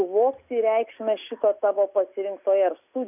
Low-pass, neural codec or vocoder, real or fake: 3.6 kHz; none; real